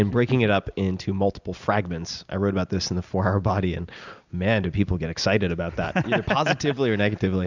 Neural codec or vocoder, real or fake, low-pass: none; real; 7.2 kHz